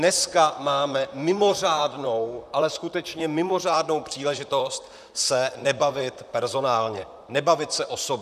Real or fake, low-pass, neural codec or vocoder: fake; 14.4 kHz; vocoder, 44.1 kHz, 128 mel bands, Pupu-Vocoder